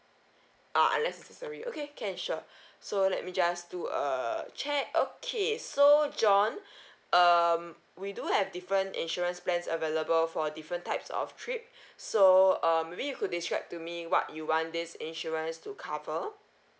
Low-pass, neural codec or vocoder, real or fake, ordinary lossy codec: none; none; real; none